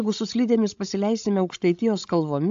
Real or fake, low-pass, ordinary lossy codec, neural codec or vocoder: fake; 7.2 kHz; AAC, 64 kbps; codec, 16 kHz, 16 kbps, FreqCodec, larger model